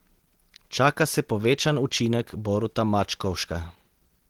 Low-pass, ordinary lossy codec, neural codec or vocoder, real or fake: 19.8 kHz; Opus, 16 kbps; none; real